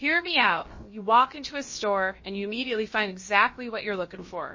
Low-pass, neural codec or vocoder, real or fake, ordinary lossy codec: 7.2 kHz; codec, 16 kHz, about 1 kbps, DyCAST, with the encoder's durations; fake; MP3, 32 kbps